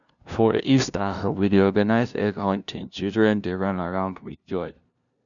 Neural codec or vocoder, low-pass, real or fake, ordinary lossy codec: codec, 16 kHz, 0.5 kbps, FunCodec, trained on LibriTTS, 25 frames a second; 7.2 kHz; fake; none